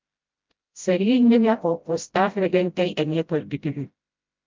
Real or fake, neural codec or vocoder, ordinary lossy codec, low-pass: fake; codec, 16 kHz, 0.5 kbps, FreqCodec, smaller model; Opus, 32 kbps; 7.2 kHz